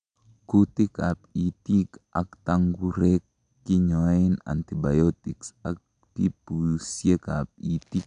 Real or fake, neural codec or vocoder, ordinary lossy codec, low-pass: real; none; none; 9.9 kHz